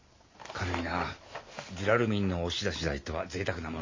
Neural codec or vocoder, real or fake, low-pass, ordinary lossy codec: none; real; 7.2 kHz; MP3, 32 kbps